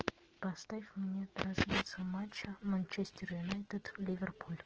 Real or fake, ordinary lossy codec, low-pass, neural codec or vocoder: fake; Opus, 16 kbps; 7.2 kHz; autoencoder, 48 kHz, 128 numbers a frame, DAC-VAE, trained on Japanese speech